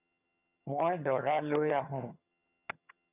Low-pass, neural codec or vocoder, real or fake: 3.6 kHz; vocoder, 22.05 kHz, 80 mel bands, HiFi-GAN; fake